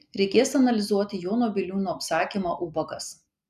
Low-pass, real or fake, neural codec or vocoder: 14.4 kHz; real; none